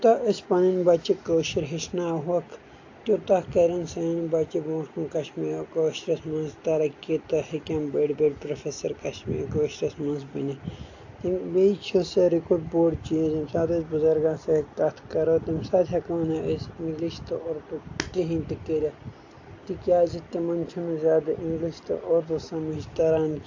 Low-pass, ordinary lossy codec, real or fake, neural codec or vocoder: 7.2 kHz; AAC, 48 kbps; real; none